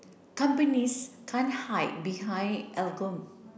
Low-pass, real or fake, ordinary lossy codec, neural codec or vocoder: none; real; none; none